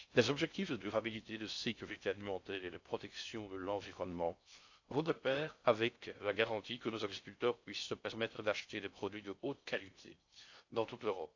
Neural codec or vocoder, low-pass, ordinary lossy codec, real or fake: codec, 16 kHz in and 24 kHz out, 0.6 kbps, FocalCodec, streaming, 4096 codes; 7.2 kHz; AAC, 48 kbps; fake